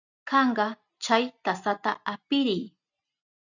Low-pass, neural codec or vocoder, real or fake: 7.2 kHz; none; real